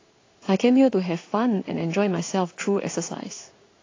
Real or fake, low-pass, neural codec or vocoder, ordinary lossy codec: fake; 7.2 kHz; codec, 16 kHz in and 24 kHz out, 1 kbps, XY-Tokenizer; AAC, 32 kbps